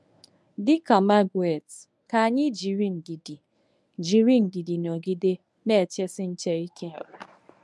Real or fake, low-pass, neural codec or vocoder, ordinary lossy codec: fake; none; codec, 24 kHz, 0.9 kbps, WavTokenizer, medium speech release version 1; none